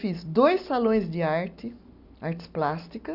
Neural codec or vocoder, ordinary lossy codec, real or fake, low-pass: none; none; real; 5.4 kHz